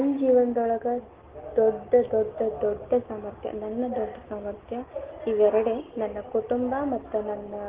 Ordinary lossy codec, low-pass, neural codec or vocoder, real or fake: Opus, 16 kbps; 3.6 kHz; none; real